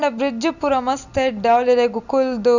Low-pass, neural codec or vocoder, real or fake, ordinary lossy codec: 7.2 kHz; none; real; none